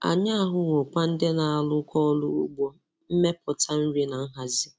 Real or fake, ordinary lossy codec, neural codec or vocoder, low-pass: real; none; none; none